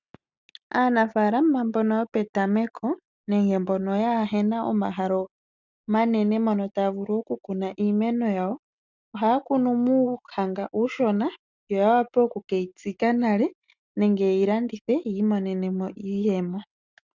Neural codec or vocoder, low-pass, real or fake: none; 7.2 kHz; real